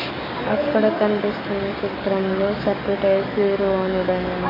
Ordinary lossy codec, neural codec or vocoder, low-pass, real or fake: AAC, 48 kbps; codec, 44.1 kHz, 7.8 kbps, DAC; 5.4 kHz; fake